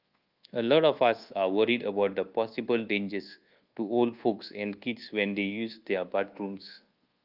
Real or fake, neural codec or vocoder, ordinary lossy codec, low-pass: fake; codec, 24 kHz, 1.2 kbps, DualCodec; Opus, 32 kbps; 5.4 kHz